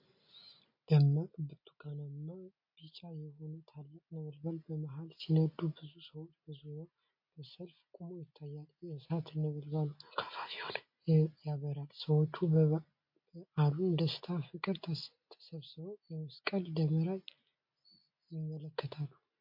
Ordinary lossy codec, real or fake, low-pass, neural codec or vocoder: MP3, 32 kbps; real; 5.4 kHz; none